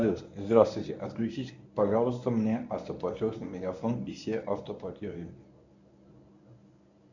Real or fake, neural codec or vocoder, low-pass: fake; codec, 24 kHz, 0.9 kbps, WavTokenizer, medium speech release version 1; 7.2 kHz